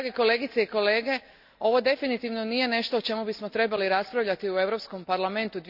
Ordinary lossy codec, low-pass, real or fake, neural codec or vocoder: none; 5.4 kHz; real; none